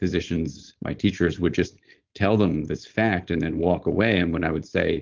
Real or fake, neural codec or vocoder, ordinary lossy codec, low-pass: fake; codec, 16 kHz, 4.8 kbps, FACodec; Opus, 16 kbps; 7.2 kHz